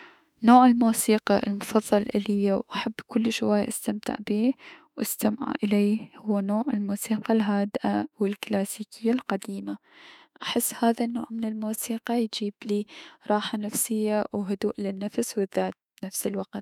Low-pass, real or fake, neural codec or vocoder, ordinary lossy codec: 19.8 kHz; fake; autoencoder, 48 kHz, 32 numbers a frame, DAC-VAE, trained on Japanese speech; none